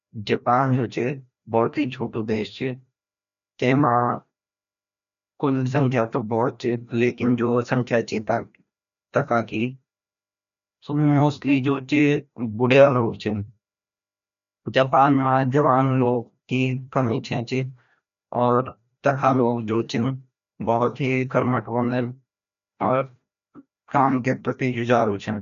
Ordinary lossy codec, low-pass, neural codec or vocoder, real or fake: none; 7.2 kHz; codec, 16 kHz, 1 kbps, FreqCodec, larger model; fake